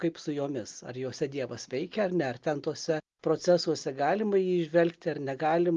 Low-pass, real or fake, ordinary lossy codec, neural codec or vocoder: 7.2 kHz; real; Opus, 32 kbps; none